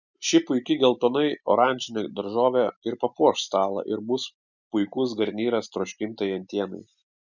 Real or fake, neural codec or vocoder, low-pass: real; none; 7.2 kHz